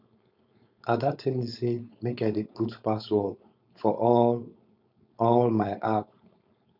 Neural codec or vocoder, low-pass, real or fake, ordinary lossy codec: codec, 16 kHz, 4.8 kbps, FACodec; 5.4 kHz; fake; none